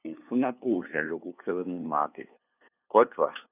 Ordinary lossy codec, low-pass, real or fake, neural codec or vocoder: none; 3.6 kHz; fake; codec, 16 kHz, 2 kbps, FunCodec, trained on LibriTTS, 25 frames a second